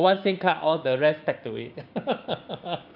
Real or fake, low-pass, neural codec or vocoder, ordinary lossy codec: fake; 5.4 kHz; codec, 16 kHz, 6 kbps, DAC; none